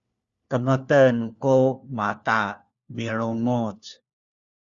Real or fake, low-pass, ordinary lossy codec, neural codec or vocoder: fake; 7.2 kHz; Opus, 64 kbps; codec, 16 kHz, 1 kbps, FunCodec, trained on LibriTTS, 50 frames a second